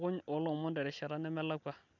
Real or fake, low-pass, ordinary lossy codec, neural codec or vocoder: real; 7.2 kHz; none; none